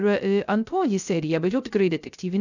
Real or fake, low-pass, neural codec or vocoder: fake; 7.2 kHz; codec, 16 kHz, 0.3 kbps, FocalCodec